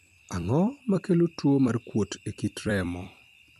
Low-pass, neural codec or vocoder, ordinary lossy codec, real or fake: 14.4 kHz; vocoder, 44.1 kHz, 128 mel bands every 256 samples, BigVGAN v2; MP3, 64 kbps; fake